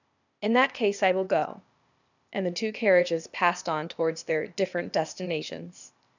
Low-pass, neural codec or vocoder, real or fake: 7.2 kHz; codec, 16 kHz, 0.8 kbps, ZipCodec; fake